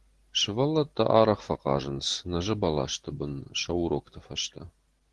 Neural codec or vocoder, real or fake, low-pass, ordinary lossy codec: none; real; 10.8 kHz; Opus, 16 kbps